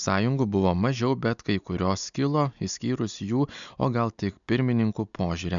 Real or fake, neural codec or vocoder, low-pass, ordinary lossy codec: real; none; 7.2 kHz; MP3, 96 kbps